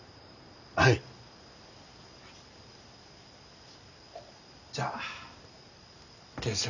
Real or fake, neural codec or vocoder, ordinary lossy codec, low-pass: real; none; none; 7.2 kHz